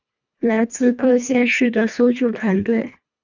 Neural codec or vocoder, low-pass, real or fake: codec, 24 kHz, 1.5 kbps, HILCodec; 7.2 kHz; fake